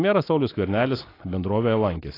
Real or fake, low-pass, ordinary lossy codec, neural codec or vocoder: real; 5.4 kHz; AAC, 24 kbps; none